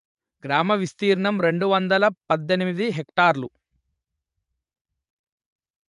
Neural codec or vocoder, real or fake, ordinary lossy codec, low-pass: vocoder, 24 kHz, 100 mel bands, Vocos; fake; none; 10.8 kHz